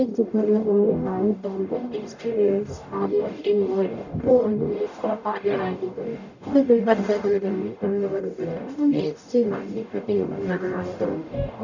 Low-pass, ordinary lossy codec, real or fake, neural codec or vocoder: 7.2 kHz; none; fake; codec, 44.1 kHz, 0.9 kbps, DAC